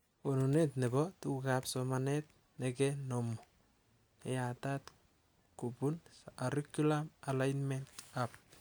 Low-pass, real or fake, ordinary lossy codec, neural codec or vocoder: none; real; none; none